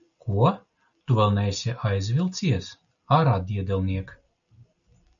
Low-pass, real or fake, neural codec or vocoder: 7.2 kHz; real; none